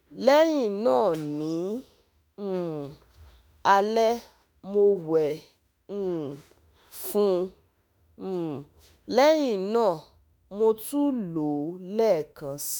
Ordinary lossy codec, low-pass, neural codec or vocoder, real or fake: none; none; autoencoder, 48 kHz, 32 numbers a frame, DAC-VAE, trained on Japanese speech; fake